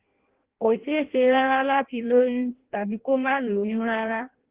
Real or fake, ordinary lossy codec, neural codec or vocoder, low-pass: fake; Opus, 16 kbps; codec, 16 kHz in and 24 kHz out, 0.6 kbps, FireRedTTS-2 codec; 3.6 kHz